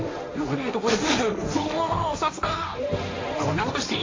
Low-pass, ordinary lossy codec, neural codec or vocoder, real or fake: 7.2 kHz; AAC, 32 kbps; codec, 16 kHz, 1.1 kbps, Voila-Tokenizer; fake